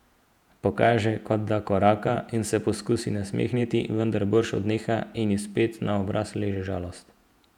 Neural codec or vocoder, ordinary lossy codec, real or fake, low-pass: vocoder, 48 kHz, 128 mel bands, Vocos; none; fake; 19.8 kHz